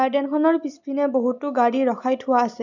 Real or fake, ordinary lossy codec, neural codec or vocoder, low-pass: real; none; none; 7.2 kHz